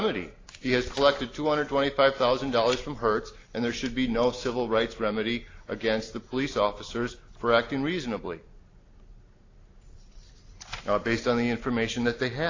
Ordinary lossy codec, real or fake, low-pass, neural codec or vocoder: AAC, 32 kbps; real; 7.2 kHz; none